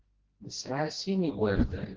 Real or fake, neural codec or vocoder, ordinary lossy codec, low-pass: fake; codec, 16 kHz, 1 kbps, FreqCodec, smaller model; Opus, 16 kbps; 7.2 kHz